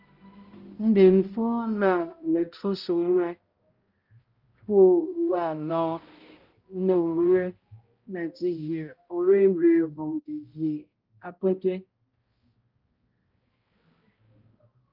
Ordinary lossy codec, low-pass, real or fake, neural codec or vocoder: Opus, 32 kbps; 5.4 kHz; fake; codec, 16 kHz, 0.5 kbps, X-Codec, HuBERT features, trained on balanced general audio